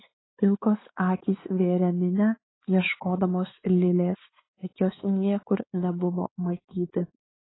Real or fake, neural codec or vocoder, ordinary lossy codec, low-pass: fake; codec, 16 kHz, 4 kbps, X-Codec, WavLM features, trained on Multilingual LibriSpeech; AAC, 16 kbps; 7.2 kHz